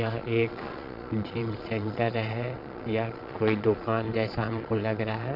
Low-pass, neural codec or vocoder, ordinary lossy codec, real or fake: 5.4 kHz; vocoder, 22.05 kHz, 80 mel bands, Vocos; none; fake